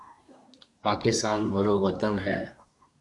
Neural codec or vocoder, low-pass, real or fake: codec, 24 kHz, 1 kbps, SNAC; 10.8 kHz; fake